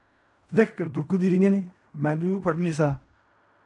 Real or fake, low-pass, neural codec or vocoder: fake; 10.8 kHz; codec, 16 kHz in and 24 kHz out, 0.4 kbps, LongCat-Audio-Codec, fine tuned four codebook decoder